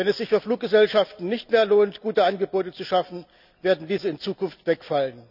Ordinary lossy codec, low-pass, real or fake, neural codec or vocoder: none; 5.4 kHz; real; none